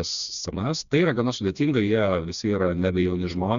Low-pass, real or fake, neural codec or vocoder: 7.2 kHz; fake; codec, 16 kHz, 2 kbps, FreqCodec, smaller model